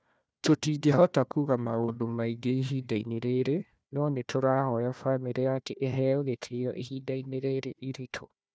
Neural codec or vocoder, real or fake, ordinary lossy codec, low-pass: codec, 16 kHz, 1 kbps, FunCodec, trained on Chinese and English, 50 frames a second; fake; none; none